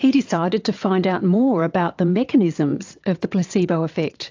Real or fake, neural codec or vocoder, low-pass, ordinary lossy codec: fake; vocoder, 22.05 kHz, 80 mel bands, WaveNeXt; 7.2 kHz; AAC, 48 kbps